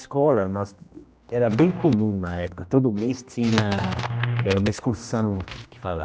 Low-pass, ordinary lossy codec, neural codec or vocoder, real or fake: none; none; codec, 16 kHz, 1 kbps, X-Codec, HuBERT features, trained on general audio; fake